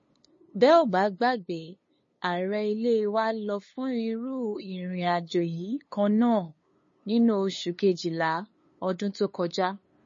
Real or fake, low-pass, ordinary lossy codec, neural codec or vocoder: fake; 7.2 kHz; MP3, 32 kbps; codec, 16 kHz, 2 kbps, FunCodec, trained on LibriTTS, 25 frames a second